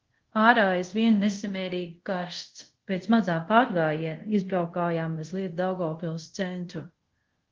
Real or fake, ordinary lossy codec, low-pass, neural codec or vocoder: fake; Opus, 16 kbps; 7.2 kHz; codec, 24 kHz, 0.5 kbps, DualCodec